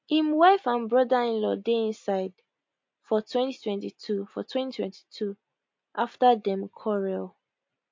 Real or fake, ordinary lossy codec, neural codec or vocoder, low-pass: real; MP3, 48 kbps; none; 7.2 kHz